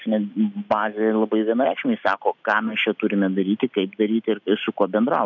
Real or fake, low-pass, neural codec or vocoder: real; 7.2 kHz; none